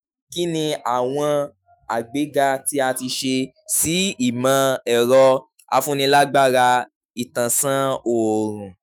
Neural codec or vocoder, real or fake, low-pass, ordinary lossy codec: autoencoder, 48 kHz, 128 numbers a frame, DAC-VAE, trained on Japanese speech; fake; none; none